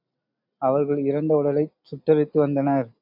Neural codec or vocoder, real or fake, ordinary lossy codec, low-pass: none; real; AAC, 48 kbps; 5.4 kHz